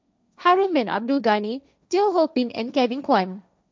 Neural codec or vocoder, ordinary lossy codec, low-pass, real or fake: codec, 16 kHz, 1.1 kbps, Voila-Tokenizer; none; none; fake